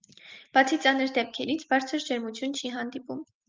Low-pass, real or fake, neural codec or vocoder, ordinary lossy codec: 7.2 kHz; real; none; Opus, 32 kbps